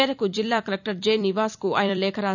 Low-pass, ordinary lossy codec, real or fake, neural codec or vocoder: 7.2 kHz; none; fake; vocoder, 44.1 kHz, 128 mel bands every 256 samples, BigVGAN v2